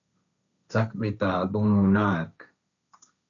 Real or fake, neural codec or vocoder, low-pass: fake; codec, 16 kHz, 1.1 kbps, Voila-Tokenizer; 7.2 kHz